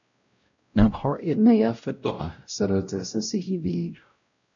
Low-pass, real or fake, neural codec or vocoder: 7.2 kHz; fake; codec, 16 kHz, 0.5 kbps, X-Codec, WavLM features, trained on Multilingual LibriSpeech